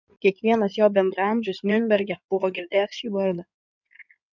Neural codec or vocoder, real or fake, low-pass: codec, 16 kHz in and 24 kHz out, 2.2 kbps, FireRedTTS-2 codec; fake; 7.2 kHz